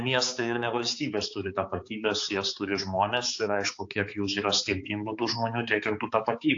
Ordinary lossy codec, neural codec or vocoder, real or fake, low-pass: AAC, 48 kbps; codec, 16 kHz, 4 kbps, X-Codec, HuBERT features, trained on balanced general audio; fake; 7.2 kHz